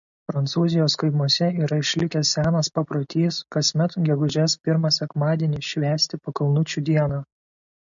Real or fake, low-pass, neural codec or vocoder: real; 7.2 kHz; none